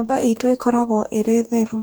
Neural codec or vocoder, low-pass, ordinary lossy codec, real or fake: codec, 44.1 kHz, 2.6 kbps, DAC; none; none; fake